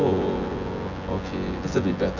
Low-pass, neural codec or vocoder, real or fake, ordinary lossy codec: 7.2 kHz; vocoder, 24 kHz, 100 mel bands, Vocos; fake; none